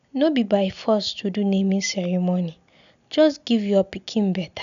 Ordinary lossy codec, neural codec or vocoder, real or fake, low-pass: none; none; real; 7.2 kHz